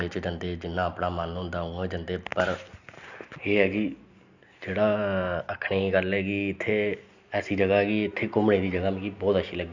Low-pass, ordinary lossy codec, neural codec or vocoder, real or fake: 7.2 kHz; none; none; real